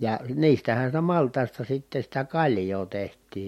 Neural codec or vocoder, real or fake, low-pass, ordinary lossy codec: none; real; 19.8 kHz; MP3, 64 kbps